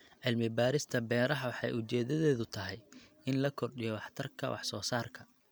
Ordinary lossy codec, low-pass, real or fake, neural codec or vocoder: none; none; real; none